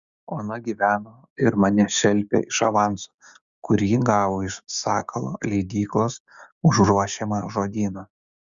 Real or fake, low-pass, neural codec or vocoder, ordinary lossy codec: fake; 7.2 kHz; codec, 16 kHz, 6 kbps, DAC; Opus, 64 kbps